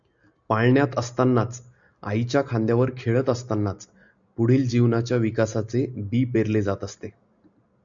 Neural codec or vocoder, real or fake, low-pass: none; real; 7.2 kHz